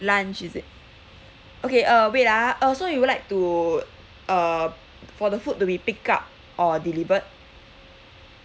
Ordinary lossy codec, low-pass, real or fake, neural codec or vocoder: none; none; real; none